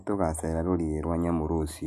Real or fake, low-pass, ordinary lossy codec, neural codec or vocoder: real; 14.4 kHz; none; none